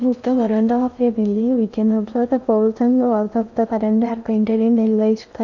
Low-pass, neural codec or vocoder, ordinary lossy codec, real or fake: 7.2 kHz; codec, 16 kHz in and 24 kHz out, 0.6 kbps, FocalCodec, streaming, 2048 codes; none; fake